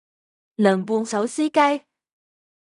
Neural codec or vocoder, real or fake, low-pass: codec, 16 kHz in and 24 kHz out, 0.4 kbps, LongCat-Audio-Codec, fine tuned four codebook decoder; fake; 10.8 kHz